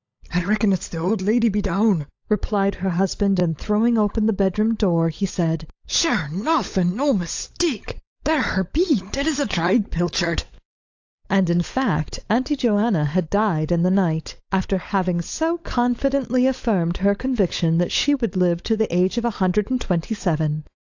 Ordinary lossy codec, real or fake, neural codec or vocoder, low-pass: AAC, 48 kbps; fake; codec, 16 kHz, 16 kbps, FunCodec, trained on LibriTTS, 50 frames a second; 7.2 kHz